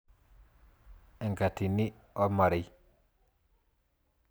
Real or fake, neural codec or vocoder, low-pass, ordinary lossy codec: real; none; none; none